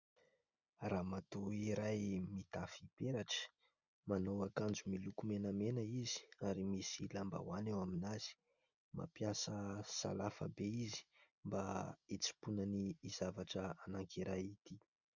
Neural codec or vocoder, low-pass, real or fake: none; 7.2 kHz; real